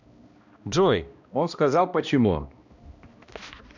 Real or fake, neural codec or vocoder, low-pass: fake; codec, 16 kHz, 1 kbps, X-Codec, HuBERT features, trained on balanced general audio; 7.2 kHz